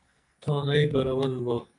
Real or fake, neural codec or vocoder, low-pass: fake; codec, 44.1 kHz, 2.6 kbps, SNAC; 10.8 kHz